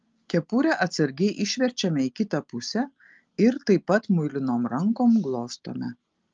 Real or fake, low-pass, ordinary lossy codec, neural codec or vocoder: real; 7.2 kHz; Opus, 32 kbps; none